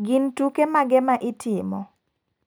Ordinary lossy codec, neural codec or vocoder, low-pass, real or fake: none; none; none; real